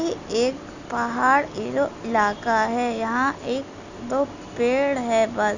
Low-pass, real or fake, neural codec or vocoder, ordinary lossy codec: 7.2 kHz; real; none; none